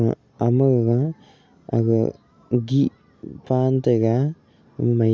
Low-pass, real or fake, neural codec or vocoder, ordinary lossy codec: none; real; none; none